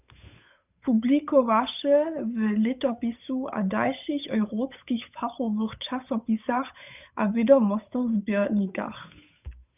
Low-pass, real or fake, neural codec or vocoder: 3.6 kHz; fake; codec, 16 kHz, 8 kbps, FunCodec, trained on Chinese and English, 25 frames a second